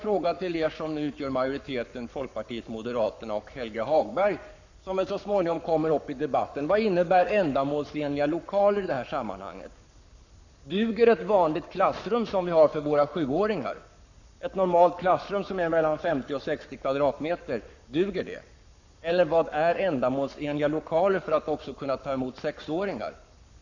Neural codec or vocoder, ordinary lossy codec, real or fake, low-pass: codec, 44.1 kHz, 7.8 kbps, Pupu-Codec; none; fake; 7.2 kHz